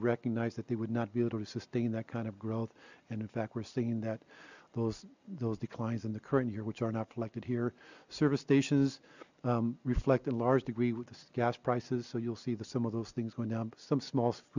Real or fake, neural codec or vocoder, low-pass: real; none; 7.2 kHz